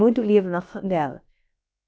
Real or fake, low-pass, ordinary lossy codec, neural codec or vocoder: fake; none; none; codec, 16 kHz, about 1 kbps, DyCAST, with the encoder's durations